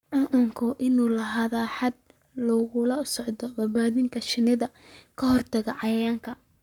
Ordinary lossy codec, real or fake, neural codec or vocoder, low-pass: none; fake; codec, 44.1 kHz, 7.8 kbps, Pupu-Codec; 19.8 kHz